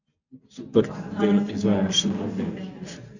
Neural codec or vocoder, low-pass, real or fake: none; 7.2 kHz; real